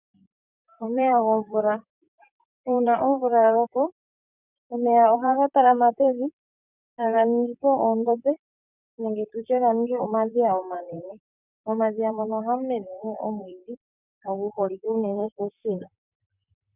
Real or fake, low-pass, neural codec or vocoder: fake; 3.6 kHz; vocoder, 44.1 kHz, 128 mel bands, Pupu-Vocoder